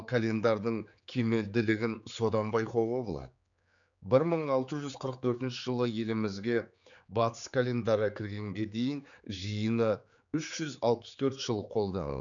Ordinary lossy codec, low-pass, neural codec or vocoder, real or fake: none; 7.2 kHz; codec, 16 kHz, 4 kbps, X-Codec, HuBERT features, trained on general audio; fake